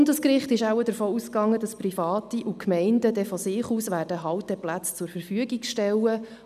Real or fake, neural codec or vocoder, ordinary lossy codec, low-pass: real; none; none; 14.4 kHz